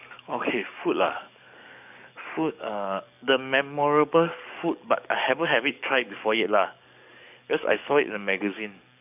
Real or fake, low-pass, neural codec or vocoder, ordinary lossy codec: fake; 3.6 kHz; codec, 44.1 kHz, 7.8 kbps, DAC; none